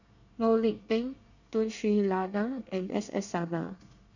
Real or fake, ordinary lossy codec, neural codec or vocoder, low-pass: fake; none; codec, 24 kHz, 1 kbps, SNAC; 7.2 kHz